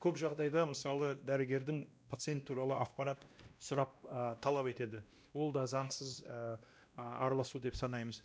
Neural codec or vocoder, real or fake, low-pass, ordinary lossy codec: codec, 16 kHz, 1 kbps, X-Codec, WavLM features, trained on Multilingual LibriSpeech; fake; none; none